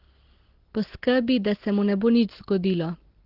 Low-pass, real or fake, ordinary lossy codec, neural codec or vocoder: 5.4 kHz; real; Opus, 16 kbps; none